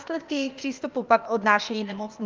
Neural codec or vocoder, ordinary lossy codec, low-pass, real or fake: codec, 16 kHz, 0.8 kbps, ZipCodec; Opus, 24 kbps; 7.2 kHz; fake